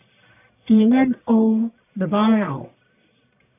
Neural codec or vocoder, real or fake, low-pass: codec, 44.1 kHz, 1.7 kbps, Pupu-Codec; fake; 3.6 kHz